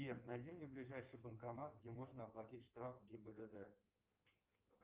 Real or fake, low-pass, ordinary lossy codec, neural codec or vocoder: fake; 3.6 kHz; Opus, 24 kbps; codec, 16 kHz in and 24 kHz out, 1.1 kbps, FireRedTTS-2 codec